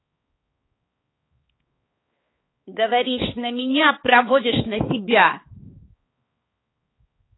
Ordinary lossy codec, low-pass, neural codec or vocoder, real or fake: AAC, 16 kbps; 7.2 kHz; codec, 16 kHz, 4 kbps, X-Codec, HuBERT features, trained on balanced general audio; fake